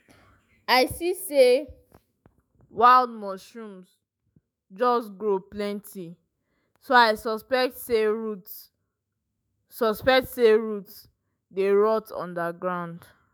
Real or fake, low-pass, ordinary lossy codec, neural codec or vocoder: fake; none; none; autoencoder, 48 kHz, 128 numbers a frame, DAC-VAE, trained on Japanese speech